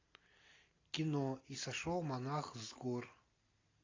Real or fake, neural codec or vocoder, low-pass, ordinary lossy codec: real; none; 7.2 kHz; AAC, 32 kbps